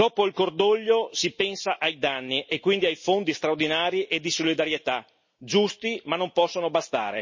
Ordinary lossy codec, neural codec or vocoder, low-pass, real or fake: MP3, 32 kbps; none; 7.2 kHz; real